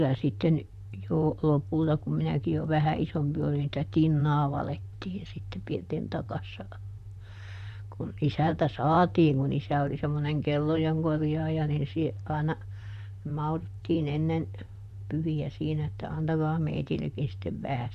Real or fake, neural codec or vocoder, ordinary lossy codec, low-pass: fake; vocoder, 44.1 kHz, 128 mel bands, Pupu-Vocoder; none; 14.4 kHz